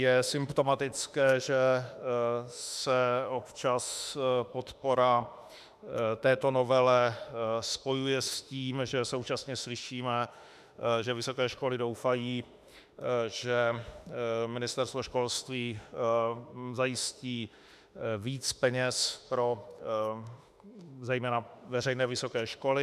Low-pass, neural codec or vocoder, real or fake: 14.4 kHz; autoencoder, 48 kHz, 32 numbers a frame, DAC-VAE, trained on Japanese speech; fake